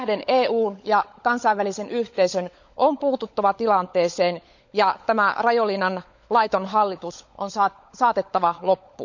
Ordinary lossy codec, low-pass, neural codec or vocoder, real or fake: none; 7.2 kHz; codec, 16 kHz, 16 kbps, FunCodec, trained on LibriTTS, 50 frames a second; fake